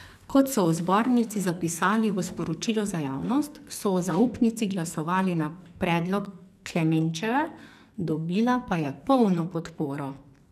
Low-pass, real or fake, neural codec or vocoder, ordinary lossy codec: 14.4 kHz; fake; codec, 44.1 kHz, 2.6 kbps, SNAC; none